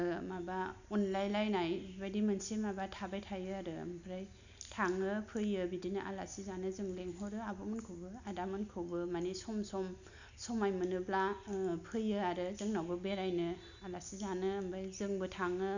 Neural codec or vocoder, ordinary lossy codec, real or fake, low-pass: none; none; real; 7.2 kHz